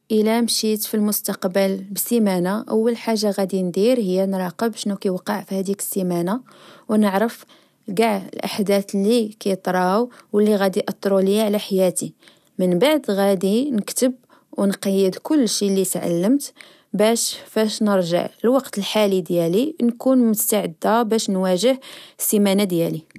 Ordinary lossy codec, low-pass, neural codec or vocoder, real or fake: none; 14.4 kHz; none; real